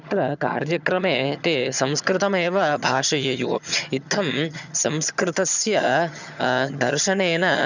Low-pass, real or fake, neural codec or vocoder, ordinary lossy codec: 7.2 kHz; fake; vocoder, 22.05 kHz, 80 mel bands, HiFi-GAN; none